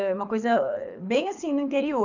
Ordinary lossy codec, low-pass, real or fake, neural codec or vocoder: none; 7.2 kHz; fake; codec, 24 kHz, 6 kbps, HILCodec